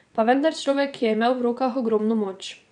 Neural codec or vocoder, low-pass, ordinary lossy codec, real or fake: vocoder, 22.05 kHz, 80 mel bands, WaveNeXt; 9.9 kHz; none; fake